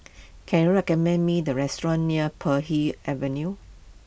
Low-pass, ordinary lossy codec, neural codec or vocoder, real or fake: none; none; none; real